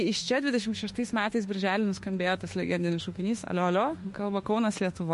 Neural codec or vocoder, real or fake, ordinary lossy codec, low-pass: autoencoder, 48 kHz, 32 numbers a frame, DAC-VAE, trained on Japanese speech; fake; MP3, 48 kbps; 14.4 kHz